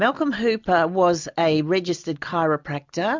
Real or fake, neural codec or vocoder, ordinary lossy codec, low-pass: real; none; MP3, 64 kbps; 7.2 kHz